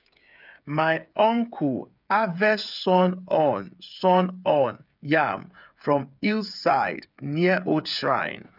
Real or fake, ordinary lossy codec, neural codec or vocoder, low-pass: fake; none; codec, 16 kHz, 8 kbps, FreqCodec, smaller model; 5.4 kHz